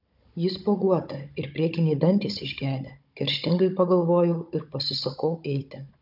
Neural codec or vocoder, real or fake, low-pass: codec, 16 kHz, 16 kbps, FunCodec, trained on Chinese and English, 50 frames a second; fake; 5.4 kHz